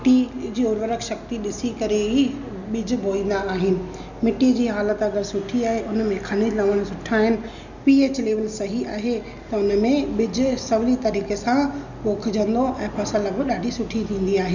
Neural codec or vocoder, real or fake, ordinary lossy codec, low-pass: none; real; none; 7.2 kHz